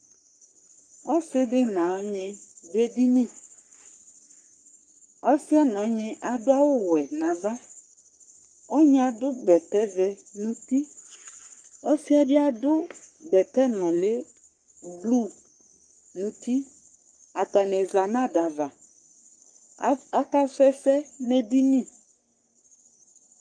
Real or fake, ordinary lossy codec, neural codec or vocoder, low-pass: fake; Opus, 32 kbps; codec, 44.1 kHz, 3.4 kbps, Pupu-Codec; 9.9 kHz